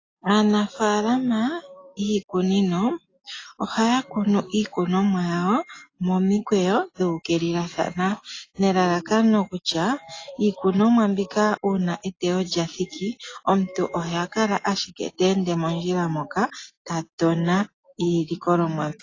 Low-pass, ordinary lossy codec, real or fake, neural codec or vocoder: 7.2 kHz; AAC, 32 kbps; real; none